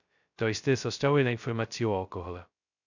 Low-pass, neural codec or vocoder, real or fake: 7.2 kHz; codec, 16 kHz, 0.2 kbps, FocalCodec; fake